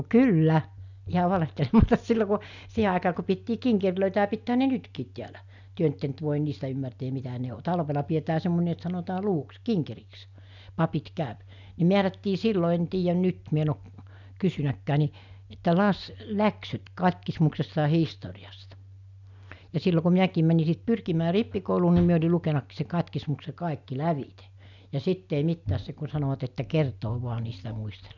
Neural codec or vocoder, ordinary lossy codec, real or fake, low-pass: none; none; real; 7.2 kHz